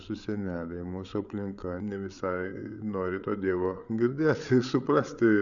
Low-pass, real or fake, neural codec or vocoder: 7.2 kHz; fake; codec, 16 kHz, 8 kbps, FreqCodec, larger model